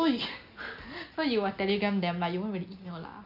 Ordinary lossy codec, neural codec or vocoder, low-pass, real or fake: none; none; 5.4 kHz; real